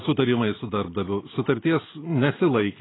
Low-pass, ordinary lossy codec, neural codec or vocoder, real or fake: 7.2 kHz; AAC, 16 kbps; none; real